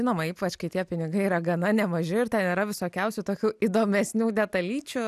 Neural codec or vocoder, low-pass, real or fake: none; 14.4 kHz; real